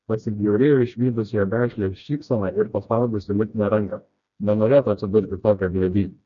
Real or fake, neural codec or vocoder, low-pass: fake; codec, 16 kHz, 1 kbps, FreqCodec, smaller model; 7.2 kHz